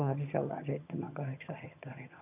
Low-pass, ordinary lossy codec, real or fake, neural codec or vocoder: 3.6 kHz; none; fake; vocoder, 22.05 kHz, 80 mel bands, HiFi-GAN